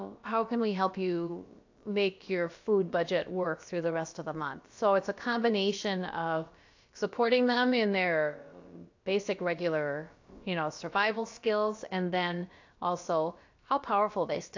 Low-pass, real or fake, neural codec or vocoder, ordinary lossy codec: 7.2 kHz; fake; codec, 16 kHz, about 1 kbps, DyCAST, with the encoder's durations; AAC, 48 kbps